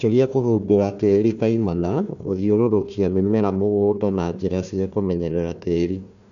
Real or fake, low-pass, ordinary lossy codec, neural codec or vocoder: fake; 7.2 kHz; none; codec, 16 kHz, 1 kbps, FunCodec, trained on Chinese and English, 50 frames a second